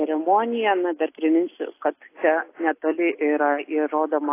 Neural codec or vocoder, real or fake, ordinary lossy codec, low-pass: none; real; AAC, 24 kbps; 3.6 kHz